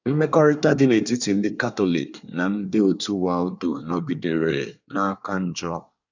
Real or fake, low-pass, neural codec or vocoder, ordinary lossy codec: fake; 7.2 kHz; codec, 24 kHz, 1 kbps, SNAC; none